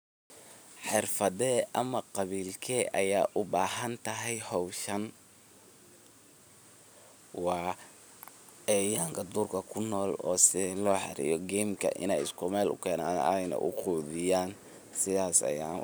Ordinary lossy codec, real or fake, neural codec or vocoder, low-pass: none; real; none; none